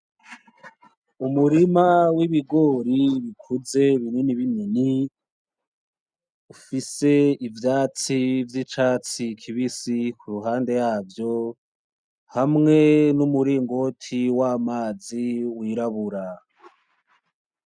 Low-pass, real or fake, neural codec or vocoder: 9.9 kHz; real; none